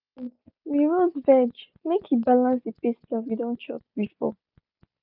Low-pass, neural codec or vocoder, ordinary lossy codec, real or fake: 5.4 kHz; none; none; real